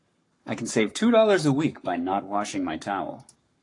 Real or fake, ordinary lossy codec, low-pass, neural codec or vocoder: fake; AAC, 48 kbps; 10.8 kHz; codec, 44.1 kHz, 7.8 kbps, Pupu-Codec